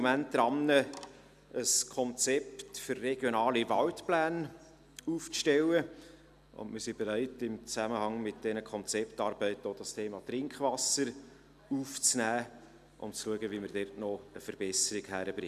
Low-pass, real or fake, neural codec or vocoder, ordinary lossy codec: 14.4 kHz; real; none; none